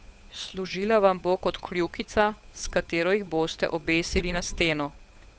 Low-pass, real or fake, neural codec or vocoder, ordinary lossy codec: none; fake; codec, 16 kHz, 8 kbps, FunCodec, trained on Chinese and English, 25 frames a second; none